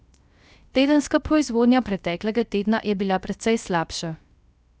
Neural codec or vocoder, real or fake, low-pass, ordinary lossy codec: codec, 16 kHz, 0.3 kbps, FocalCodec; fake; none; none